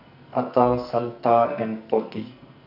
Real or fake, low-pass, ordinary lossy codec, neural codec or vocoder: fake; 5.4 kHz; none; codec, 44.1 kHz, 2.6 kbps, SNAC